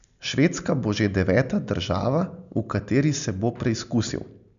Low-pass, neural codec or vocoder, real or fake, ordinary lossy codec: 7.2 kHz; none; real; none